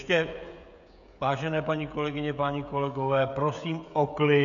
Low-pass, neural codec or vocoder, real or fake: 7.2 kHz; codec, 16 kHz, 16 kbps, FreqCodec, smaller model; fake